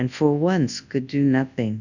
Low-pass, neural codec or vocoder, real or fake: 7.2 kHz; codec, 24 kHz, 0.9 kbps, WavTokenizer, large speech release; fake